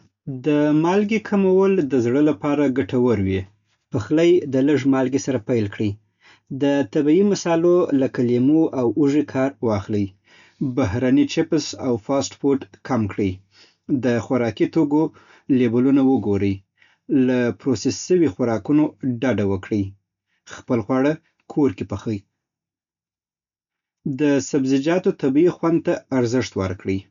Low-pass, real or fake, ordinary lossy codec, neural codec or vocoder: 7.2 kHz; real; none; none